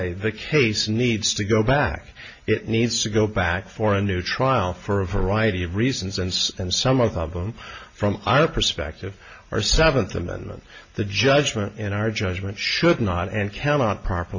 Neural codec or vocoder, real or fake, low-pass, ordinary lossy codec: none; real; 7.2 kHz; MP3, 32 kbps